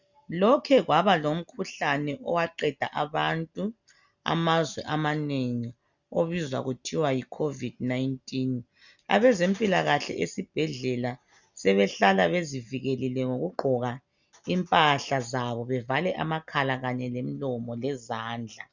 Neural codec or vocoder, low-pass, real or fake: none; 7.2 kHz; real